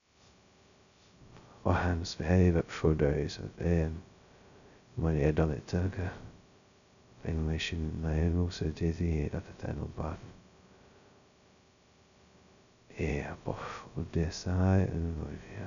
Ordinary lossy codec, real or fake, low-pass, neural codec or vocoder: none; fake; 7.2 kHz; codec, 16 kHz, 0.2 kbps, FocalCodec